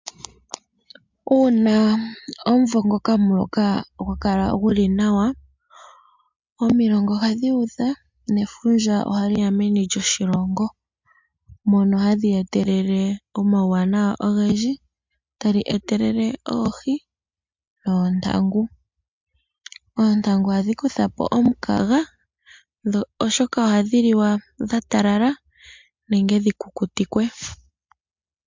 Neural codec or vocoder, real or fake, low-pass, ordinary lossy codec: none; real; 7.2 kHz; MP3, 64 kbps